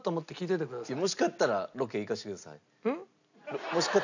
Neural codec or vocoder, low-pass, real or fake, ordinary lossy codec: none; 7.2 kHz; real; none